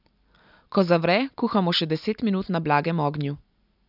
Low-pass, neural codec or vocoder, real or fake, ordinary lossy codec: 5.4 kHz; none; real; none